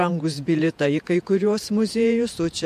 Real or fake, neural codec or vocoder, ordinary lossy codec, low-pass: fake; vocoder, 48 kHz, 128 mel bands, Vocos; MP3, 64 kbps; 14.4 kHz